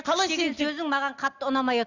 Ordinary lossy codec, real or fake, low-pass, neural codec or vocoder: none; real; 7.2 kHz; none